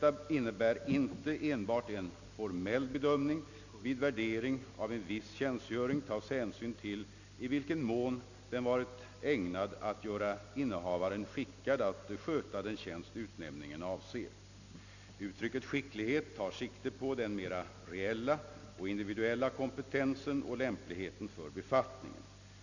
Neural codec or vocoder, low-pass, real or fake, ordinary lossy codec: none; 7.2 kHz; real; none